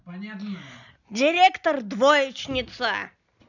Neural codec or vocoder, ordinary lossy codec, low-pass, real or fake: none; none; 7.2 kHz; real